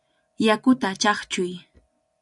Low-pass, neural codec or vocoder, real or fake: 10.8 kHz; none; real